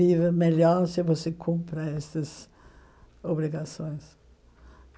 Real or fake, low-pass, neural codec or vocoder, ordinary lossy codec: real; none; none; none